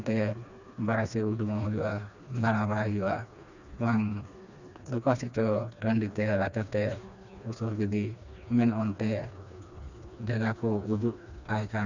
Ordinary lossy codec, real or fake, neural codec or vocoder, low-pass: none; fake; codec, 16 kHz, 2 kbps, FreqCodec, smaller model; 7.2 kHz